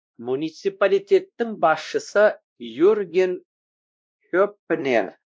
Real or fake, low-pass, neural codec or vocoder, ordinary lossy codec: fake; none; codec, 16 kHz, 1 kbps, X-Codec, WavLM features, trained on Multilingual LibriSpeech; none